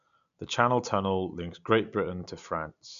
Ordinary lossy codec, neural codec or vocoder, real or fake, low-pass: none; none; real; 7.2 kHz